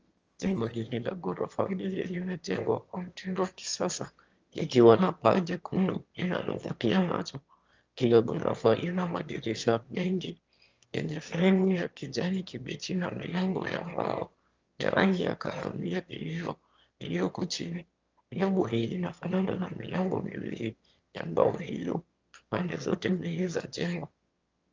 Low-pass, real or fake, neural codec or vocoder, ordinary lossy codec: 7.2 kHz; fake; autoencoder, 22.05 kHz, a latent of 192 numbers a frame, VITS, trained on one speaker; Opus, 24 kbps